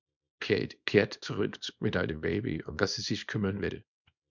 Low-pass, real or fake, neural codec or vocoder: 7.2 kHz; fake; codec, 24 kHz, 0.9 kbps, WavTokenizer, small release